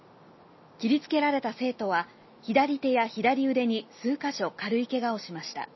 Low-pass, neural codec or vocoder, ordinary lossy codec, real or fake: 7.2 kHz; none; MP3, 24 kbps; real